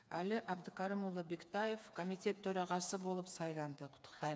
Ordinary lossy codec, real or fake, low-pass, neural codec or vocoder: none; fake; none; codec, 16 kHz, 4 kbps, FreqCodec, smaller model